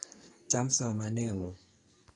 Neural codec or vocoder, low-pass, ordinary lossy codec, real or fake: codec, 44.1 kHz, 2.6 kbps, SNAC; 10.8 kHz; AAC, 32 kbps; fake